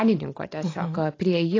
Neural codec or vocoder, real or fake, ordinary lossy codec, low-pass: none; real; AAC, 32 kbps; 7.2 kHz